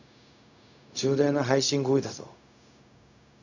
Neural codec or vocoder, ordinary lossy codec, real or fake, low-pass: codec, 16 kHz, 0.4 kbps, LongCat-Audio-Codec; none; fake; 7.2 kHz